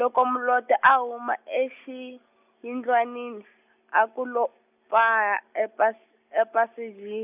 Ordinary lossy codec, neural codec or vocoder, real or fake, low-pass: none; none; real; 3.6 kHz